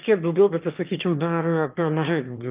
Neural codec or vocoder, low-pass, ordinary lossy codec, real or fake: autoencoder, 22.05 kHz, a latent of 192 numbers a frame, VITS, trained on one speaker; 3.6 kHz; Opus, 24 kbps; fake